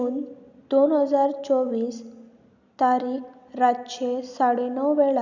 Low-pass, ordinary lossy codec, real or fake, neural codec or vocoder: 7.2 kHz; none; real; none